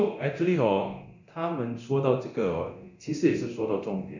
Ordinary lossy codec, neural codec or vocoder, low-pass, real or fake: none; codec, 24 kHz, 0.9 kbps, DualCodec; 7.2 kHz; fake